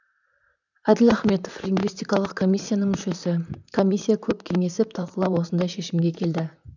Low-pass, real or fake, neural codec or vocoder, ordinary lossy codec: 7.2 kHz; fake; vocoder, 44.1 kHz, 128 mel bands, Pupu-Vocoder; none